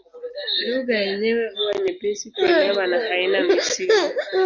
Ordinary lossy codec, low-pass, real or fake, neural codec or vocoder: Opus, 64 kbps; 7.2 kHz; real; none